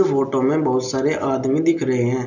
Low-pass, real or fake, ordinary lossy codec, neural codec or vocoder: 7.2 kHz; real; none; none